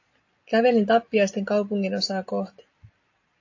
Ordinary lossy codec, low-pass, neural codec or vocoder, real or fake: AAC, 48 kbps; 7.2 kHz; none; real